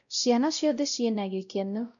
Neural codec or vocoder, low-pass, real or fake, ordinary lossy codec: codec, 16 kHz, 0.5 kbps, X-Codec, WavLM features, trained on Multilingual LibriSpeech; 7.2 kHz; fake; none